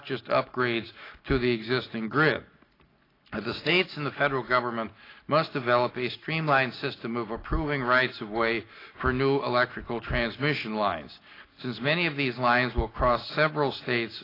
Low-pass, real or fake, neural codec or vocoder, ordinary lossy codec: 5.4 kHz; real; none; AAC, 24 kbps